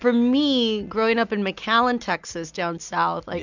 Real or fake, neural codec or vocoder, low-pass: real; none; 7.2 kHz